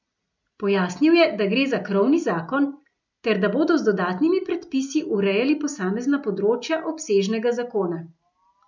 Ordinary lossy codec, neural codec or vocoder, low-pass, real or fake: none; none; 7.2 kHz; real